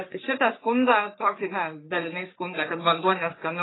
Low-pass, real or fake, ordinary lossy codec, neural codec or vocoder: 7.2 kHz; fake; AAC, 16 kbps; codec, 44.1 kHz, 3.4 kbps, Pupu-Codec